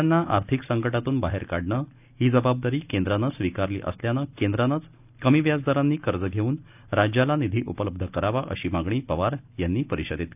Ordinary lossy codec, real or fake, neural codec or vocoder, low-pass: none; real; none; 3.6 kHz